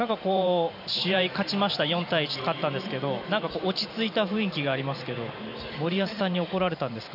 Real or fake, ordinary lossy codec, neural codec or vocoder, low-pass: fake; none; vocoder, 44.1 kHz, 128 mel bands every 512 samples, BigVGAN v2; 5.4 kHz